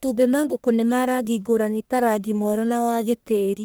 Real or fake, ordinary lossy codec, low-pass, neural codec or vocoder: fake; none; none; codec, 44.1 kHz, 1.7 kbps, Pupu-Codec